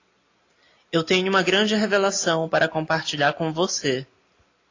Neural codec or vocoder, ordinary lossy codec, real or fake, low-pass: none; AAC, 32 kbps; real; 7.2 kHz